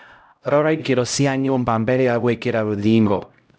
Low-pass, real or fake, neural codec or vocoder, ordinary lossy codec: none; fake; codec, 16 kHz, 0.5 kbps, X-Codec, HuBERT features, trained on LibriSpeech; none